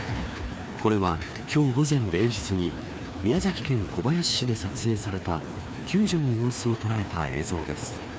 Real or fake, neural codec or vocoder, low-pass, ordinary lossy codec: fake; codec, 16 kHz, 2 kbps, FreqCodec, larger model; none; none